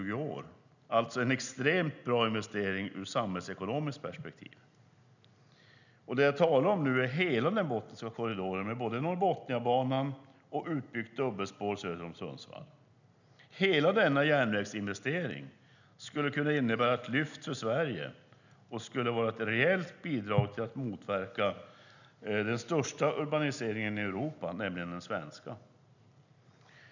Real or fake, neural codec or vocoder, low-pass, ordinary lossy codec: real; none; 7.2 kHz; none